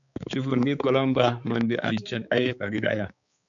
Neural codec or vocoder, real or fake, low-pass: codec, 16 kHz, 4 kbps, X-Codec, HuBERT features, trained on balanced general audio; fake; 7.2 kHz